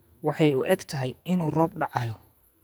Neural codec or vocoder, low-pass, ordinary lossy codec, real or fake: codec, 44.1 kHz, 2.6 kbps, SNAC; none; none; fake